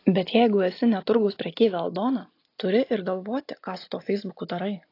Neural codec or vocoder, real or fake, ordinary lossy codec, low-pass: none; real; AAC, 32 kbps; 5.4 kHz